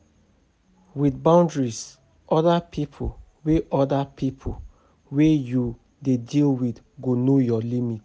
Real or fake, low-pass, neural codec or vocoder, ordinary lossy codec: real; none; none; none